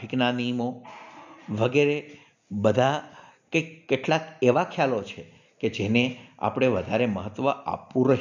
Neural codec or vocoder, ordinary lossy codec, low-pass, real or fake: none; none; 7.2 kHz; real